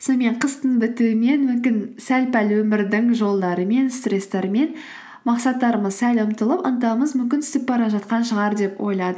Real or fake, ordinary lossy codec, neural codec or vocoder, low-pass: real; none; none; none